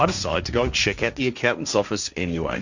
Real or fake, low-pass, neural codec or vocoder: fake; 7.2 kHz; codec, 16 kHz, 1.1 kbps, Voila-Tokenizer